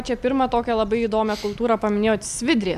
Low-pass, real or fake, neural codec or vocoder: 14.4 kHz; real; none